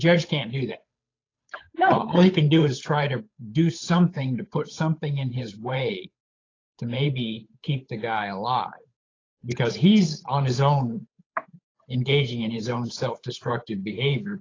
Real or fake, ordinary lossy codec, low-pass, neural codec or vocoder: fake; AAC, 32 kbps; 7.2 kHz; codec, 16 kHz, 8 kbps, FunCodec, trained on Chinese and English, 25 frames a second